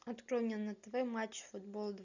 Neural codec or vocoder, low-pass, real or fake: none; 7.2 kHz; real